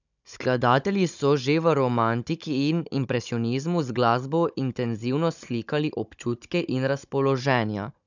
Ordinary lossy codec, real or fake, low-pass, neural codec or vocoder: none; fake; 7.2 kHz; codec, 16 kHz, 16 kbps, FunCodec, trained on Chinese and English, 50 frames a second